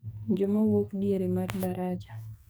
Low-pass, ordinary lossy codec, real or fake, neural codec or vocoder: none; none; fake; codec, 44.1 kHz, 2.6 kbps, SNAC